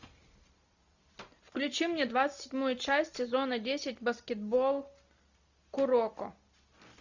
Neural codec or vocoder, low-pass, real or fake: none; 7.2 kHz; real